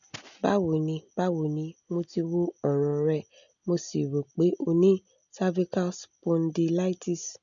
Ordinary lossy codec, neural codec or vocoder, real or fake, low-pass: none; none; real; 7.2 kHz